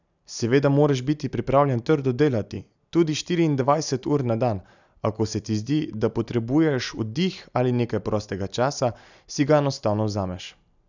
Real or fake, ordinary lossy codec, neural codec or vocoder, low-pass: real; none; none; 7.2 kHz